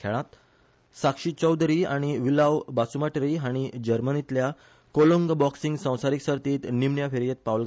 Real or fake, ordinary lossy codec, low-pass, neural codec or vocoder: real; none; none; none